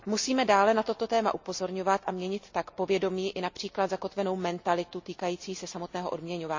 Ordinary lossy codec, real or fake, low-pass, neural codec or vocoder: MP3, 64 kbps; real; 7.2 kHz; none